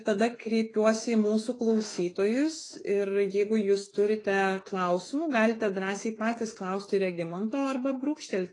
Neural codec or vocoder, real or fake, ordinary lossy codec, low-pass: autoencoder, 48 kHz, 32 numbers a frame, DAC-VAE, trained on Japanese speech; fake; AAC, 32 kbps; 10.8 kHz